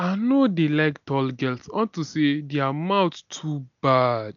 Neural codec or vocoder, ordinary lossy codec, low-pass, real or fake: none; none; 7.2 kHz; real